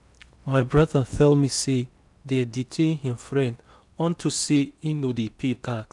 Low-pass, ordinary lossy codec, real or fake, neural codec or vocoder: 10.8 kHz; none; fake; codec, 16 kHz in and 24 kHz out, 0.8 kbps, FocalCodec, streaming, 65536 codes